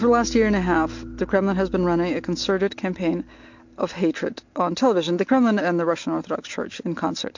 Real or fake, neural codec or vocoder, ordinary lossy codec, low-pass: real; none; MP3, 48 kbps; 7.2 kHz